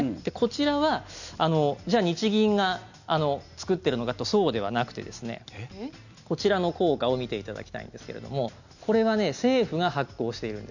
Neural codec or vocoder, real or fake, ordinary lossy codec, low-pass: none; real; none; 7.2 kHz